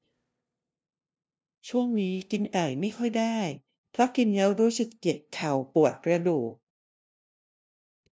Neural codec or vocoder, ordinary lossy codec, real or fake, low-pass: codec, 16 kHz, 0.5 kbps, FunCodec, trained on LibriTTS, 25 frames a second; none; fake; none